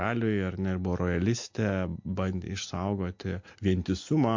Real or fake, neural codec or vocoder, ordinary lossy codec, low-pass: real; none; MP3, 48 kbps; 7.2 kHz